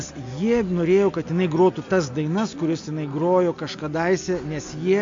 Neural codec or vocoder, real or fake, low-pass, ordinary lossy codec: none; real; 7.2 kHz; MP3, 48 kbps